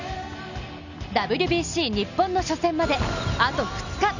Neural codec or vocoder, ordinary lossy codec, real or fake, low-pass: none; none; real; 7.2 kHz